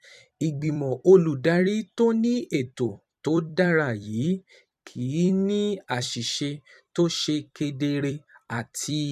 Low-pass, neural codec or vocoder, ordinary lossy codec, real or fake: 14.4 kHz; vocoder, 44.1 kHz, 128 mel bands every 256 samples, BigVGAN v2; none; fake